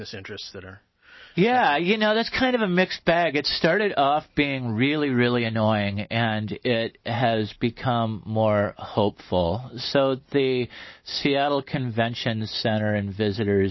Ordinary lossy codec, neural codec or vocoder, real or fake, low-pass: MP3, 24 kbps; none; real; 7.2 kHz